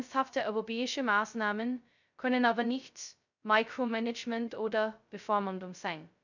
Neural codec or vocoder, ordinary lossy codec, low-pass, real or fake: codec, 16 kHz, 0.2 kbps, FocalCodec; none; 7.2 kHz; fake